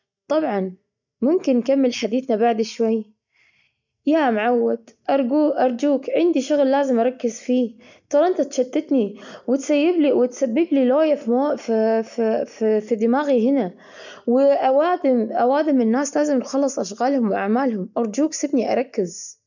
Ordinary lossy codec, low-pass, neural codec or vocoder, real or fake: none; 7.2 kHz; none; real